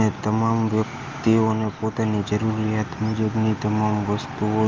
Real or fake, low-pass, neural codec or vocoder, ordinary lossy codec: real; 7.2 kHz; none; Opus, 32 kbps